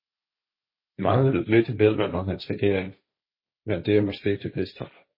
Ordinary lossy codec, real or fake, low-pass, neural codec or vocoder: MP3, 24 kbps; fake; 5.4 kHz; codec, 16 kHz, 1.1 kbps, Voila-Tokenizer